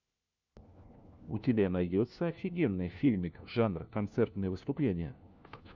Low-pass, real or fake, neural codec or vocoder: 7.2 kHz; fake; codec, 16 kHz, 1 kbps, FunCodec, trained on LibriTTS, 50 frames a second